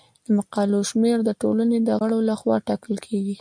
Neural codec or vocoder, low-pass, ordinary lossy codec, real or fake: none; 9.9 kHz; MP3, 96 kbps; real